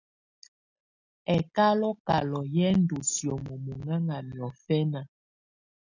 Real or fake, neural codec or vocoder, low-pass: real; none; 7.2 kHz